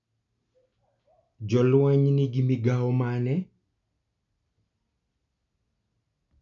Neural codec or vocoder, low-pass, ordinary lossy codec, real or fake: none; 7.2 kHz; none; real